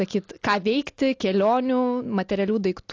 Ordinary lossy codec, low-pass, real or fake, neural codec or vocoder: AAC, 48 kbps; 7.2 kHz; real; none